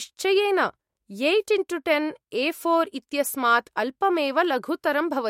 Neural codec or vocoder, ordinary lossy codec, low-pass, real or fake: autoencoder, 48 kHz, 128 numbers a frame, DAC-VAE, trained on Japanese speech; MP3, 64 kbps; 19.8 kHz; fake